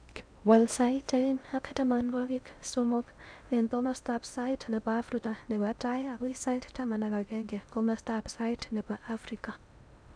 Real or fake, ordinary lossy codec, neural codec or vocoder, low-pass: fake; none; codec, 16 kHz in and 24 kHz out, 0.6 kbps, FocalCodec, streaming, 2048 codes; 9.9 kHz